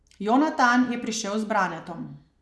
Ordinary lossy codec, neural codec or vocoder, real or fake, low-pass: none; none; real; none